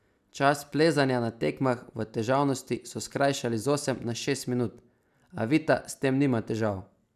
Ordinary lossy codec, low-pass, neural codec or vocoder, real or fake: none; 14.4 kHz; none; real